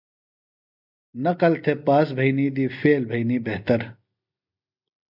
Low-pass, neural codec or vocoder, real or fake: 5.4 kHz; none; real